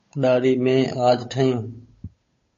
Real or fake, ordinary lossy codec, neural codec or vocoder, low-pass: fake; MP3, 32 kbps; codec, 16 kHz, 8 kbps, FunCodec, trained on Chinese and English, 25 frames a second; 7.2 kHz